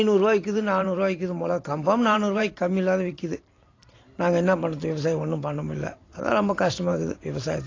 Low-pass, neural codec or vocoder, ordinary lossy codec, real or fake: 7.2 kHz; vocoder, 44.1 kHz, 128 mel bands every 512 samples, BigVGAN v2; AAC, 32 kbps; fake